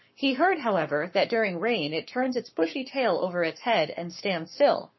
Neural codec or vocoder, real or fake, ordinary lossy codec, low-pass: codec, 44.1 kHz, 7.8 kbps, Pupu-Codec; fake; MP3, 24 kbps; 7.2 kHz